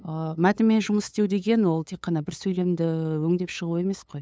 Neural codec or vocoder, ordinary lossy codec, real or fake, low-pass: codec, 16 kHz, 8 kbps, FunCodec, trained on LibriTTS, 25 frames a second; none; fake; none